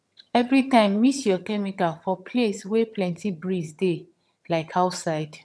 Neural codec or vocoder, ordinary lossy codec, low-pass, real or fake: vocoder, 22.05 kHz, 80 mel bands, HiFi-GAN; none; none; fake